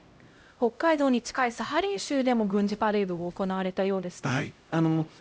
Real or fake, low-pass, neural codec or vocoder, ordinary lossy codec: fake; none; codec, 16 kHz, 0.5 kbps, X-Codec, HuBERT features, trained on LibriSpeech; none